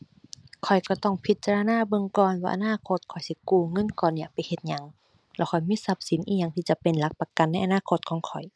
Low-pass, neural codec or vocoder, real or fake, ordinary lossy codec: none; none; real; none